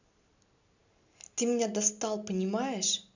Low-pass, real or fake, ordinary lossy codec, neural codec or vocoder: 7.2 kHz; real; none; none